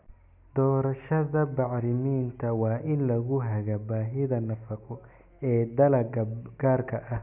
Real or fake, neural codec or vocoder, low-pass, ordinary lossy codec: real; none; 3.6 kHz; none